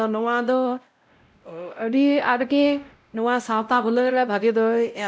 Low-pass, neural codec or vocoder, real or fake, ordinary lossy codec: none; codec, 16 kHz, 0.5 kbps, X-Codec, WavLM features, trained on Multilingual LibriSpeech; fake; none